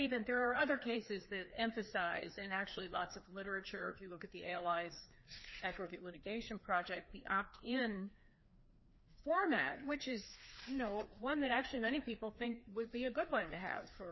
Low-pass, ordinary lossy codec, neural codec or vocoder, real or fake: 7.2 kHz; MP3, 24 kbps; codec, 16 kHz, 2 kbps, FreqCodec, larger model; fake